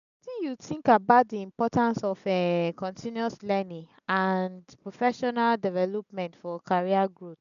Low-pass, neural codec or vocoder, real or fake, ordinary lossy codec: 7.2 kHz; none; real; none